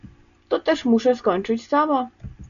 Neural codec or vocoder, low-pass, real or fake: none; 7.2 kHz; real